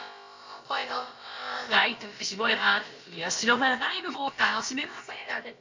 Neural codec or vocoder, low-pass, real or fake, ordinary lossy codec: codec, 16 kHz, about 1 kbps, DyCAST, with the encoder's durations; 7.2 kHz; fake; MP3, 48 kbps